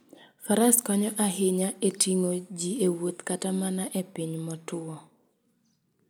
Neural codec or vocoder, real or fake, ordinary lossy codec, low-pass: none; real; none; none